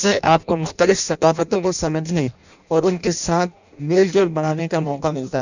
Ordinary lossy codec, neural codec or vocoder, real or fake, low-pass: none; codec, 16 kHz in and 24 kHz out, 0.6 kbps, FireRedTTS-2 codec; fake; 7.2 kHz